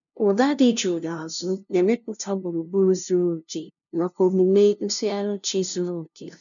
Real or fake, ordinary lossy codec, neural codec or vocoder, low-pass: fake; none; codec, 16 kHz, 0.5 kbps, FunCodec, trained on LibriTTS, 25 frames a second; 7.2 kHz